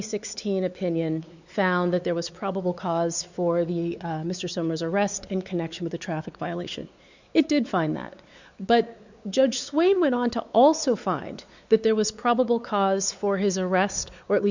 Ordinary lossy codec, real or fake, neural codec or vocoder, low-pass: Opus, 64 kbps; fake; codec, 16 kHz, 4 kbps, X-Codec, WavLM features, trained on Multilingual LibriSpeech; 7.2 kHz